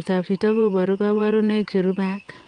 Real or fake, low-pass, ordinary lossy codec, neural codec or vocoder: fake; 9.9 kHz; Opus, 32 kbps; vocoder, 22.05 kHz, 80 mel bands, WaveNeXt